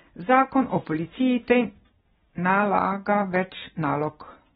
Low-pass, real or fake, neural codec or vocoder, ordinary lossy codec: 19.8 kHz; real; none; AAC, 16 kbps